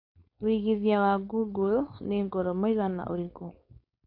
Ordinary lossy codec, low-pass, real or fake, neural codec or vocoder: AAC, 32 kbps; 5.4 kHz; fake; codec, 16 kHz, 4.8 kbps, FACodec